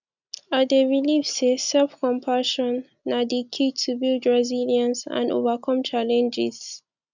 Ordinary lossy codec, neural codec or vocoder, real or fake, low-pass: none; none; real; 7.2 kHz